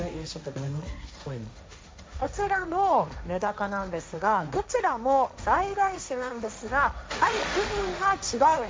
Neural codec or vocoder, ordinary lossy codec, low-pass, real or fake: codec, 16 kHz, 1.1 kbps, Voila-Tokenizer; none; none; fake